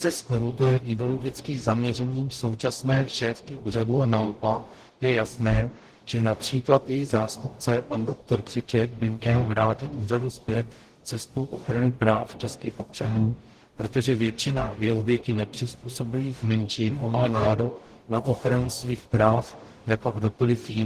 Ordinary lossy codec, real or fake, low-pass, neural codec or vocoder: Opus, 16 kbps; fake; 14.4 kHz; codec, 44.1 kHz, 0.9 kbps, DAC